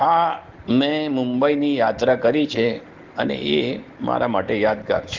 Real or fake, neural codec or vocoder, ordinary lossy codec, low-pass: real; none; Opus, 16 kbps; 7.2 kHz